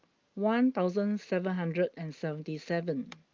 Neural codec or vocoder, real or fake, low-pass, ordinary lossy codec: none; real; 7.2 kHz; Opus, 32 kbps